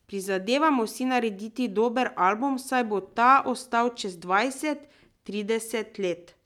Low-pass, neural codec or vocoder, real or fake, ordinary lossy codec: 19.8 kHz; none; real; none